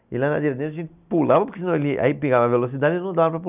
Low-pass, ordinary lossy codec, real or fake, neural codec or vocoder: 3.6 kHz; none; real; none